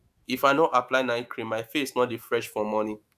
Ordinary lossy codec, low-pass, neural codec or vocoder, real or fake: AAC, 96 kbps; 14.4 kHz; autoencoder, 48 kHz, 128 numbers a frame, DAC-VAE, trained on Japanese speech; fake